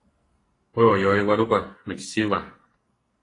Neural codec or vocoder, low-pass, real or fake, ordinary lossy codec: codec, 44.1 kHz, 2.6 kbps, SNAC; 10.8 kHz; fake; AAC, 32 kbps